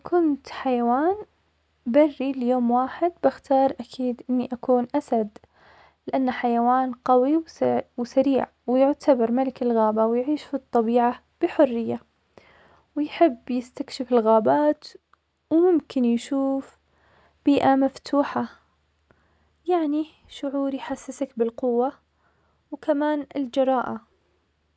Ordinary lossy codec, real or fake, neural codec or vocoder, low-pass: none; real; none; none